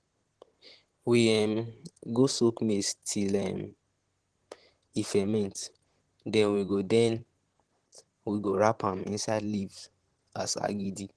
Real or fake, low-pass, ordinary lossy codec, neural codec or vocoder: fake; 10.8 kHz; Opus, 16 kbps; vocoder, 44.1 kHz, 128 mel bands, Pupu-Vocoder